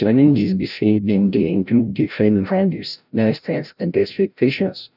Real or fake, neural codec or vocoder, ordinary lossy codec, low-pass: fake; codec, 16 kHz, 0.5 kbps, FreqCodec, larger model; none; 5.4 kHz